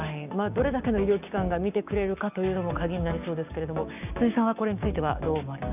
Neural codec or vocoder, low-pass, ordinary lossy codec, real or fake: codec, 16 kHz, 6 kbps, DAC; 3.6 kHz; none; fake